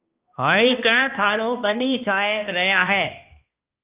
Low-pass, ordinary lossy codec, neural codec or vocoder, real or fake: 3.6 kHz; Opus, 32 kbps; codec, 16 kHz, 1 kbps, X-Codec, HuBERT features, trained on balanced general audio; fake